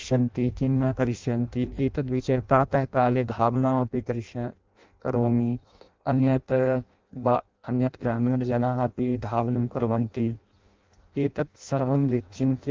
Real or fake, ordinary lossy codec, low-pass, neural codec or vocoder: fake; Opus, 32 kbps; 7.2 kHz; codec, 16 kHz in and 24 kHz out, 0.6 kbps, FireRedTTS-2 codec